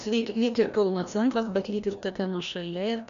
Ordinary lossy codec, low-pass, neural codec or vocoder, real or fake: MP3, 96 kbps; 7.2 kHz; codec, 16 kHz, 1 kbps, FreqCodec, larger model; fake